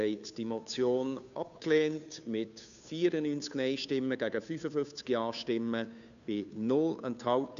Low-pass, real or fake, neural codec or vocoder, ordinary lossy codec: 7.2 kHz; fake; codec, 16 kHz, 2 kbps, FunCodec, trained on Chinese and English, 25 frames a second; none